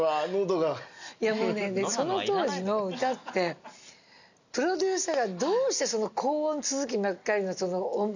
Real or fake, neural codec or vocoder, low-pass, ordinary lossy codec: real; none; 7.2 kHz; none